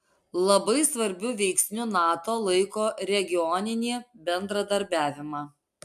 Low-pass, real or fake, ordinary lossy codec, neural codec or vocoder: 14.4 kHz; real; AAC, 96 kbps; none